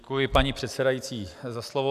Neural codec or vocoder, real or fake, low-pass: none; real; 14.4 kHz